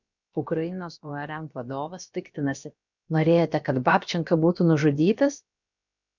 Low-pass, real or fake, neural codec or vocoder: 7.2 kHz; fake; codec, 16 kHz, about 1 kbps, DyCAST, with the encoder's durations